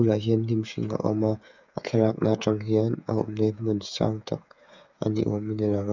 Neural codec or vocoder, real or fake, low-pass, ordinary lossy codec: codec, 16 kHz, 8 kbps, FreqCodec, smaller model; fake; 7.2 kHz; none